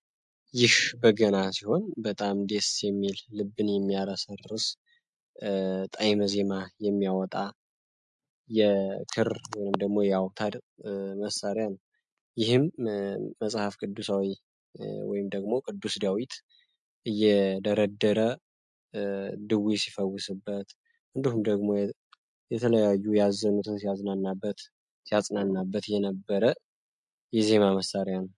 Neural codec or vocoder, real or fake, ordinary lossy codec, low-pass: none; real; MP3, 64 kbps; 10.8 kHz